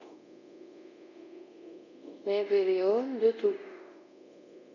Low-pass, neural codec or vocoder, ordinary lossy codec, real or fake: 7.2 kHz; codec, 24 kHz, 0.5 kbps, DualCodec; none; fake